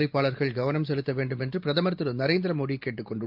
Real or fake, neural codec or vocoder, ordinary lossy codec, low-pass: real; none; Opus, 24 kbps; 5.4 kHz